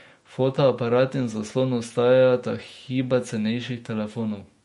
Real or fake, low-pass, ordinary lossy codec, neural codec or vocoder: fake; 19.8 kHz; MP3, 48 kbps; autoencoder, 48 kHz, 128 numbers a frame, DAC-VAE, trained on Japanese speech